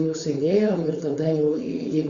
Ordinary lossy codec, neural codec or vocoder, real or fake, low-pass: Opus, 64 kbps; codec, 16 kHz, 4.8 kbps, FACodec; fake; 7.2 kHz